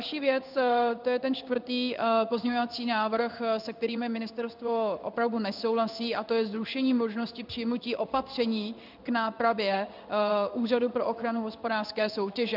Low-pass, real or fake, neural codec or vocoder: 5.4 kHz; fake; codec, 16 kHz in and 24 kHz out, 1 kbps, XY-Tokenizer